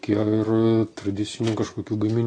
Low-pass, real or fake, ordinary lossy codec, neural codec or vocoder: 9.9 kHz; real; AAC, 32 kbps; none